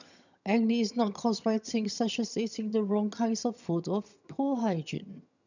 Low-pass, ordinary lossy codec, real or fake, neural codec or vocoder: 7.2 kHz; none; fake; vocoder, 22.05 kHz, 80 mel bands, HiFi-GAN